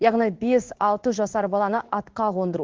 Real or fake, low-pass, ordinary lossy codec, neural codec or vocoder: fake; 7.2 kHz; Opus, 16 kbps; codec, 16 kHz in and 24 kHz out, 1 kbps, XY-Tokenizer